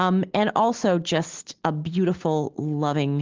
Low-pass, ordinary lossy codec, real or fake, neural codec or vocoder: 7.2 kHz; Opus, 16 kbps; real; none